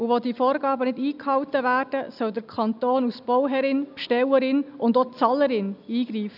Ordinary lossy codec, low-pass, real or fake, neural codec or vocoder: none; 5.4 kHz; real; none